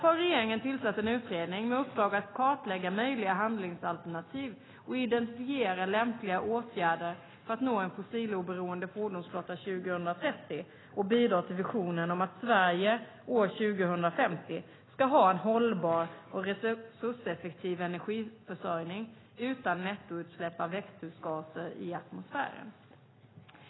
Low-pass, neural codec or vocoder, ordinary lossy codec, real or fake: 7.2 kHz; none; AAC, 16 kbps; real